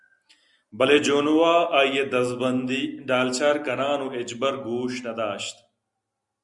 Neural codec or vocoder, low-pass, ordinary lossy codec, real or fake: none; 10.8 kHz; Opus, 64 kbps; real